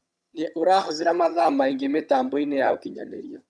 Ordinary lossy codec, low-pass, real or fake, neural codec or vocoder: none; none; fake; vocoder, 22.05 kHz, 80 mel bands, HiFi-GAN